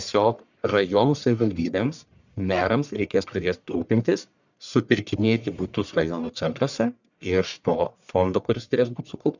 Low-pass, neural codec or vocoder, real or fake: 7.2 kHz; codec, 44.1 kHz, 1.7 kbps, Pupu-Codec; fake